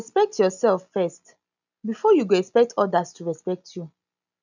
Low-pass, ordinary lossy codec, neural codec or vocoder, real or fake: 7.2 kHz; none; none; real